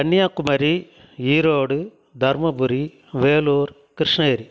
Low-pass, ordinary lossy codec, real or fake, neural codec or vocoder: none; none; real; none